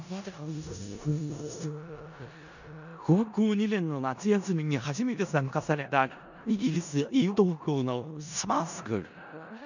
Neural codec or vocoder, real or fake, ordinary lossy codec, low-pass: codec, 16 kHz in and 24 kHz out, 0.4 kbps, LongCat-Audio-Codec, four codebook decoder; fake; none; 7.2 kHz